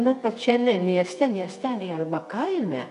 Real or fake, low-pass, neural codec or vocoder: fake; 10.8 kHz; codec, 24 kHz, 0.9 kbps, WavTokenizer, medium music audio release